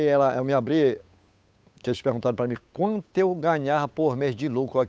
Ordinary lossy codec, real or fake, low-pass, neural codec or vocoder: none; fake; none; codec, 16 kHz, 8 kbps, FunCodec, trained on Chinese and English, 25 frames a second